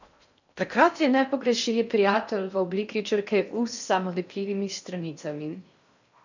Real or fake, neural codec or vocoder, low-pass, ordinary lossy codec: fake; codec, 16 kHz in and 24 kHz out, 0.6 kbps, FocalCodec, streaming, 4096 codes; 7.2 kHz; none